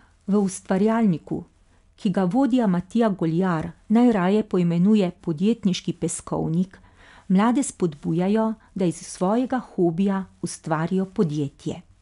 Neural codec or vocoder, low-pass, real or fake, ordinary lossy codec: none; 10.8 kHz; real; none